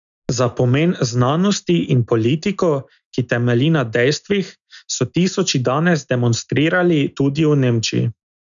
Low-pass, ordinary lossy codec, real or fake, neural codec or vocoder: 7.2 kHz; none; real; none